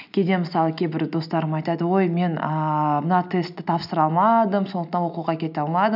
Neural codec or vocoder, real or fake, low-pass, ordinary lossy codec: none; real; 5.4 kHz; none